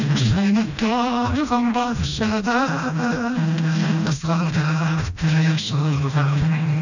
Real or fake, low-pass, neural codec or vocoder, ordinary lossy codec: fake; 7.2 kHz; codec, 16 kHz, 1 kbps, FreqCodec, smaller model; none